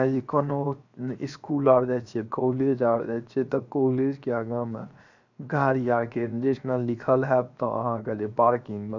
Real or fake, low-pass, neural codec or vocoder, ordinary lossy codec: fake; 7.2 kHz; codec, 16 kHz, 0.7 kbps, FocalCodec; none